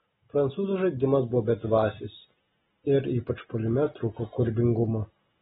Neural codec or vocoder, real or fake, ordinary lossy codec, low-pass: none; real; AAC, 16 kbps; 7.2 kHz